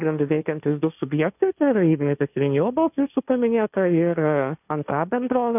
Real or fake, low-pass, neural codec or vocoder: fake; 3.6 kHz; codec, 16 kHz, 1.1 kbps, Voila-Tokenizer